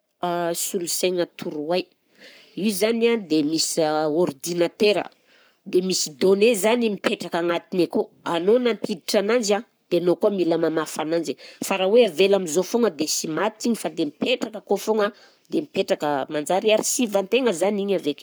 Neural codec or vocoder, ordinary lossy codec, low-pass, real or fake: codec, 44.1 kHz, 7.8 kbps, Pupu-Codec; none; none; fake